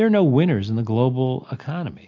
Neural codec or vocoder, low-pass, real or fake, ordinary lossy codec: none; 7.2 kHz; real; MP3, 64 kbps